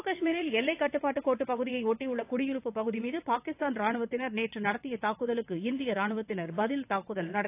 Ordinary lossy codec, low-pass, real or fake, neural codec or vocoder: AAC, 24 kbps; 3.6 kHz; fake; vocoder, 22.05 kHz, 80 mel bands, WaveNeXt